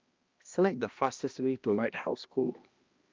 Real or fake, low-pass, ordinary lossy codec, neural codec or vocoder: fake; 7.2 kHz; Opus, 24 kbps; codec, 16 kHz, 1 kbps, X-Codec, HuBERT features, trained on balanced general audio